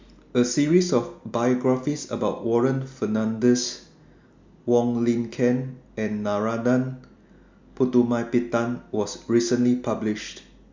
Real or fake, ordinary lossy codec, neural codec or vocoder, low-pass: real; MP3, 64 kbps; none; 7.2 kHz